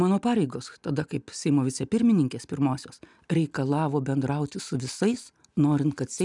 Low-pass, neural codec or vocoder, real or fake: 10.8 kHz; none; real